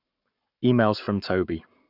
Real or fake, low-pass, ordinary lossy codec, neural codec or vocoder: fake; 5.4 kHz; none; codec, 44.1 kHz, 7.8 kbps, Pupu-Codec